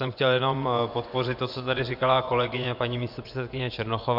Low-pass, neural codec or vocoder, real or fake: 5.4 kHz; vocoder, 24 kHz, 100 mel bands, Vocos; fake